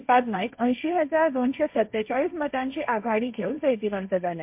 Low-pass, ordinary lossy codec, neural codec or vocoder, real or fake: 3.6 kHz; MP3, 32 kbps; codec, 16 kHz, 1.1 kbps, Voila-Tokenizer; fake